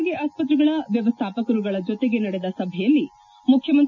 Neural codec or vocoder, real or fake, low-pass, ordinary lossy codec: none; real; 7.2 kHz; none